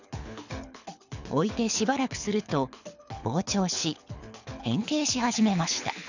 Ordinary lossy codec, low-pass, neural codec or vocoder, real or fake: none; 7.2 kHz; codec, 24 kHz, 6 kbps, HILCodec; fake